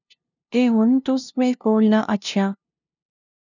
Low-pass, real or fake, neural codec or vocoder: 7.2 kHz; fake; codec, 16 kHz, 0.5 kbps, FunCodec, trained on LibriTTS, 25 frames a second